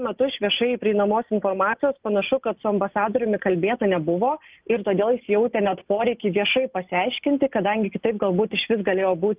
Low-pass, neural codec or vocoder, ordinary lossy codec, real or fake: 3.6 kHz; none; Opus, 24 kbps; real